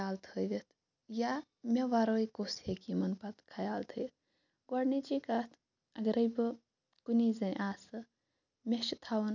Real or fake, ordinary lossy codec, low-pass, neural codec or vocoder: real; none; 7.2 kHz; none